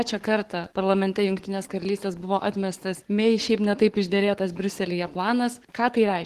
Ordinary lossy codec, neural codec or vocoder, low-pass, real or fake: Opus, 16 kbps; codec, 44.1 kHz, 7.8 kbps, Pupu-Codec; 14.4 kHz; fake